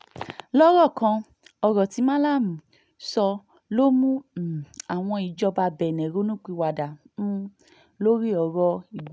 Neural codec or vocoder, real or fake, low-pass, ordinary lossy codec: none; real; none; none